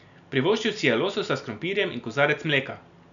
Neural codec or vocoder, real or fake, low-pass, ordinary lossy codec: none; real; 7.2 kHz; none